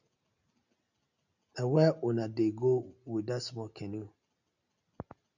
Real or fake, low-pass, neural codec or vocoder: real; 7.2 kHz; none